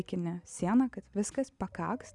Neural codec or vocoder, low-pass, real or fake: none; 10.8 kHz; real